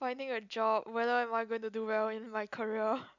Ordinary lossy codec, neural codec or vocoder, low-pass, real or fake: none; none; 7.2 kHz; real